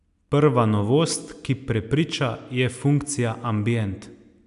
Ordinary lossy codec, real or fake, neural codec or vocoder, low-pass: AAC, 96 kbps; real; none; 10.8 kHz